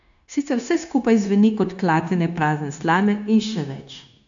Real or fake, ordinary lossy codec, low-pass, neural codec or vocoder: fake; AAC, 64 kbps; 7.2 kHz; codec, 16 kHz, 0.9 kbps, LongCat-Audio-Codec